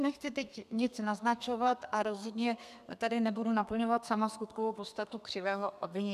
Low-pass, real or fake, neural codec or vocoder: 14.4 kHz; fake; codec, 32 kHz, 1.9 kbps, SNAC